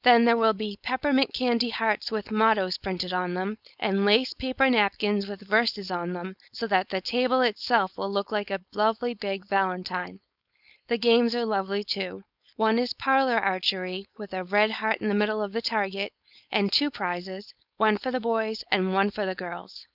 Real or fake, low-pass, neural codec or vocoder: fake; 5.4 kHz; codec, 16 kHz, 4.8 kbps, FACodec